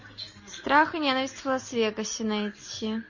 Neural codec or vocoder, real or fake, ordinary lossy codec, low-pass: none; real; MP3, 32 kbps; 7.2 kHz